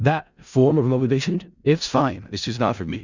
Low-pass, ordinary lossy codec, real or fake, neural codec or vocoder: 7.2 kHz; Opus, 64 kbps; fake; codec, 16 kHz in and 24 kHz out, 0.4 kbps, LongCat-Audio-Codec, four codebook decoder